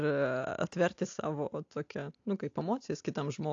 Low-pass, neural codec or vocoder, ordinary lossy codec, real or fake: 7.2 kHz; none; AAC, 48 kbps; real